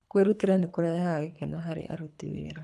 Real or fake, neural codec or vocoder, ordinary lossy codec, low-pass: fake; codec, 24 kHz, 3 kbps, HILCodec; none; none